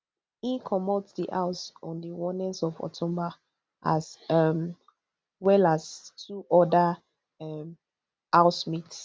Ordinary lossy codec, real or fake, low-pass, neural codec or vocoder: none; real; none; none